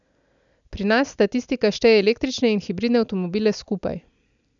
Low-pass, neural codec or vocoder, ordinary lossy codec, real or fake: 7.2 kHz; none; none; real